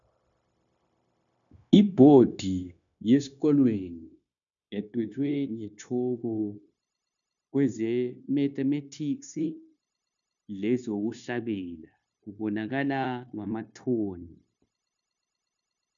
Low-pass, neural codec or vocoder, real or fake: 7.2 kHz; codec, 16 kHz, 0.9 kbps, LongCat-Audio-Codec; fake